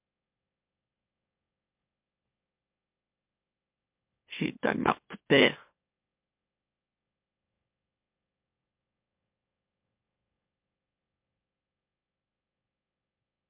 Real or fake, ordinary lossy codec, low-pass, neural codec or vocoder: fake; MP3, 32 kbps; 3.6 kHz; autoencoder, 44.1 kHz, a latent of 192 numbers a frame, MeloTTS